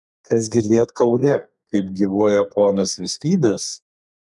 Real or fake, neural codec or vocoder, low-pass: fake; codec, 32 kHz, 1.9 kbps, SNAC; 10.8 kHz